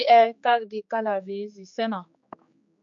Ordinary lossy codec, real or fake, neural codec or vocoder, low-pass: MP3, 48 kbps; fake; codec, 16 kHz, 4 kbps, X-Codec, HuBERT features, trained on general audio; 7.2 kHz